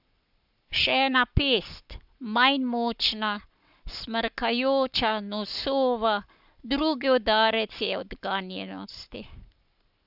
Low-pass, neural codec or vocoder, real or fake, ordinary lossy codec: 5.4 kHz; codec, 44.1 kHz, 7.8 kbps, Pupu-Codec; fake; none